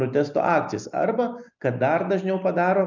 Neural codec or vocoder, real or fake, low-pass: none; real; 7.2 kHz